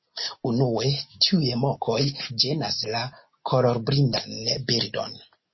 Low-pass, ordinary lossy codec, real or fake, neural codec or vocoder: 7.2 kHz; MP3, 24 kbps; real; none